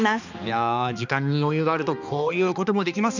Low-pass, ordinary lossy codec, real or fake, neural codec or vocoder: 7.2 kHz; none; fake; codec, 16 kHz, 2 kbps, X-Codec, HuBERT features, trained on balanced general audio